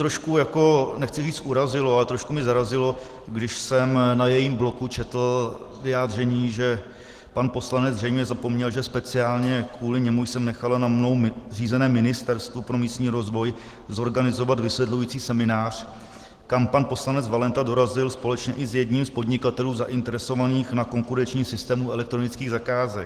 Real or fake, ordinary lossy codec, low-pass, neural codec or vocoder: real; Opus, 16 kbps; 14.4 kHz; none